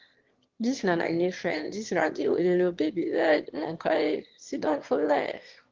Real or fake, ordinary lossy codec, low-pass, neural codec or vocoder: fake; Opus, 16 kbps; 7.2 kHz; autoencoder, 22.05 kHz, a latent of 192 numbers a frame, VITS, trained on one speaker